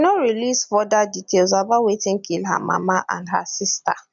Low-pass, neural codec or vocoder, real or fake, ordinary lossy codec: 7.2 kHz; none; real; none